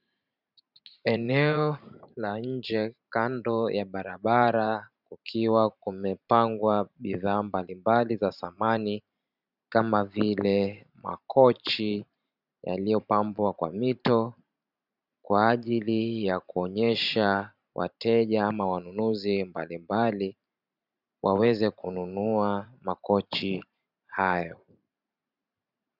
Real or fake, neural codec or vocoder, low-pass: fake; vocoder, 44.1 kHz, 128 mel bands every 512 samples, BigVGAN v2; 5.4 kHz